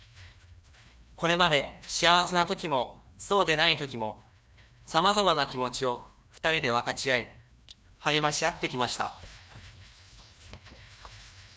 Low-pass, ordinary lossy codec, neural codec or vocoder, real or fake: none; none; codec, 16 kHz, 1 kbps, FreqCodec, larger model; fake